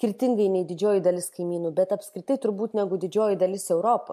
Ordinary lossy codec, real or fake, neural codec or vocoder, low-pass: MP3, 64 kbps; real; none; 14.4 kHz